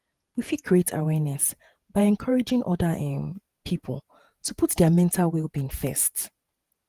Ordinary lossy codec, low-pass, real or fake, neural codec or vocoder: Opus, 24 kbps; 14.4 kHz; fake; vocoder, 48 kHz, 128 mel bands, Vocos